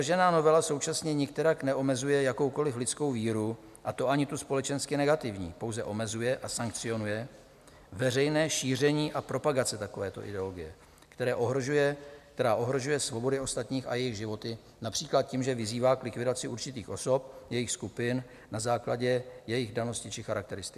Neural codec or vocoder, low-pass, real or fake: none; 14.4 kHz; real